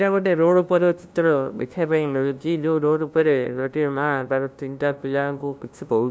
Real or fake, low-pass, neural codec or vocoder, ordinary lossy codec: fake; none; codec, 16 kHz, 0.5 kbps, FunCodec, trained on LibriTTS, 25 frames a second; none